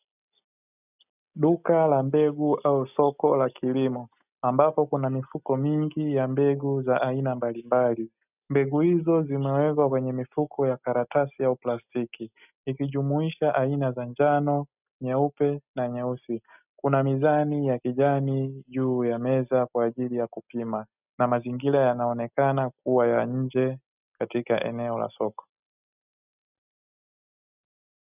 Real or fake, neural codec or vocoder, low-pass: real; none; 3.6 kHz